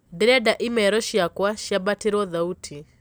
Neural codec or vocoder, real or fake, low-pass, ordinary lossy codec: none; real; none; none